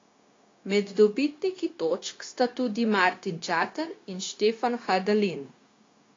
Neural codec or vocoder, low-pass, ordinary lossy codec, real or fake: codec, 16 kHz, 0.9 kbps, LongCat-Audio-Codec; 7.2 kHz; AAC, 32 kbps; fake